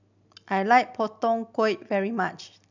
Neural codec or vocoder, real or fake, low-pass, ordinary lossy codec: none; real; 7.2 kHz; none